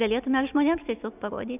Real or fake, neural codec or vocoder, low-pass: real; none; 3.6 kHz